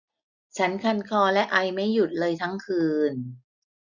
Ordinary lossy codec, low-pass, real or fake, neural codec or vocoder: none; 7.2 kHz; real; none